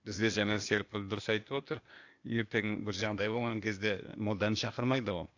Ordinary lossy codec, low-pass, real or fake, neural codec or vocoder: AAC, 48 kbps; 7.2 kHz; fake; codec, 16 kHz, 0.8 kbps, ZipCodec